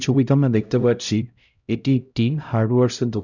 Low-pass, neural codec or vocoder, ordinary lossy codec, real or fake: 7.2 kHz; codec, 16 kHz, 0.5 kbps, X-Codec, HuBERT features, trained on LibriSpeech; none; fake